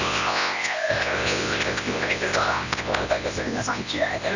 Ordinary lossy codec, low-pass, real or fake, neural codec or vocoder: none; 7.2 kHz; fake; codec, 24 kHz, 0.9 kbps, WavTokenizer, large speech release